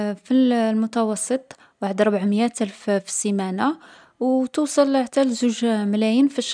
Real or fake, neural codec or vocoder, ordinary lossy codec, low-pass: real; none; none; 9.9 kHz